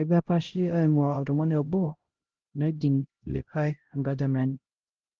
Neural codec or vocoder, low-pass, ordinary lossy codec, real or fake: codec, 16 kHz, 0.5 kbps, X-Codec, HuBERT features, trained on LibriSpeech; 7.2 kHz; Opus, 16 kbps; fake